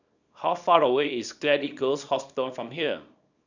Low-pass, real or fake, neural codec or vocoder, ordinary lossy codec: 7.2 kHz; fake; codec, 24 kHz, 0.9 kbps, WavTokenizer, small release; none